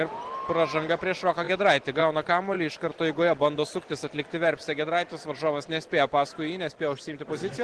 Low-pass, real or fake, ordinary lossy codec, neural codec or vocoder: 10.8 kHz; real; Opus, 16 kbps; none